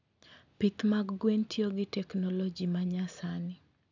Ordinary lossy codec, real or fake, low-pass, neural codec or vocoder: none; real; 7.2 kHz; none